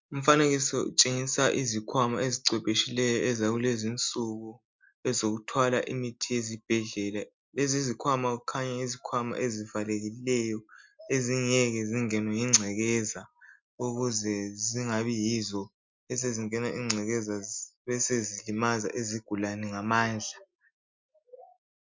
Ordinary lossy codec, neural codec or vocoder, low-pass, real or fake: MP3, 64 kbps; none; 7.2 kHz; real